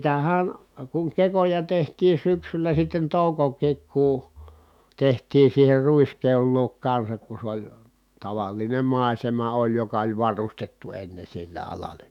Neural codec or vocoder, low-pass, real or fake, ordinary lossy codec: autoencoder, 48 kHz, 128 numbers a frame, DAC-VAE, trained on Japanese speech; 19.8 kHz; fake; none